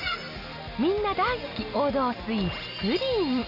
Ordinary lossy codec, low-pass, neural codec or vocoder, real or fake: none; 5.4 kHz; none; real